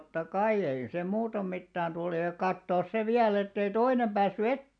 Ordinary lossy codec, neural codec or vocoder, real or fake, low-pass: none; none; real; 9.9 kHz